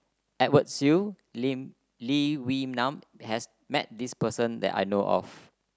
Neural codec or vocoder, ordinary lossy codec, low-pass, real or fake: none; none; none; real